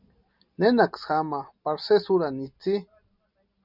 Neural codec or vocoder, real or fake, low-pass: none; real; 5.4 kHz